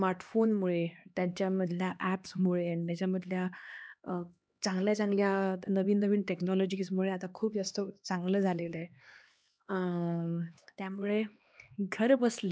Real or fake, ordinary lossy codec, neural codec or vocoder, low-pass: fake; none; codec, 16 kHz, 2 kbps, X-Codec, HuBERT features, trained on LibriSpeech; none